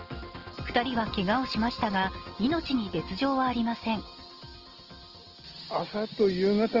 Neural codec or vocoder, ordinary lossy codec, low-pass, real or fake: none; Opus, 16 kbps; 5.4 kHz; real